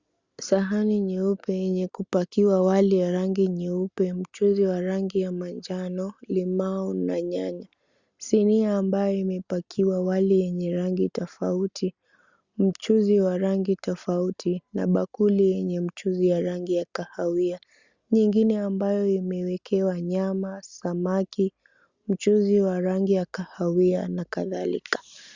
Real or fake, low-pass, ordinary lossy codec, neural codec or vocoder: real; 7.2 kHz; Opus, 64 kbps; none